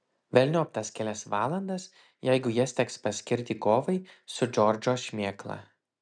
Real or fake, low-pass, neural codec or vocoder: real; 9.9 kHz; none